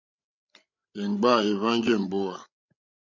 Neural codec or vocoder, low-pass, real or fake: codec, 16 kHz, 16 kbps, FreqCodec, larger model; 7.2 kHz; fake